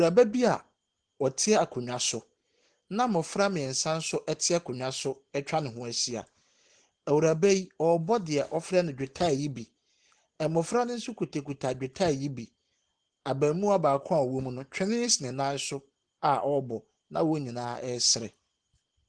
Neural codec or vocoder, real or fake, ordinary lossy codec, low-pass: none; real; Opus, 16 kbps; 9.9 kHz